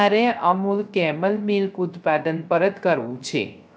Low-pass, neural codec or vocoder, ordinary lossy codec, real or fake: none; codec, 16 kHz, 0.3 kbps, FocalCodec; none; fake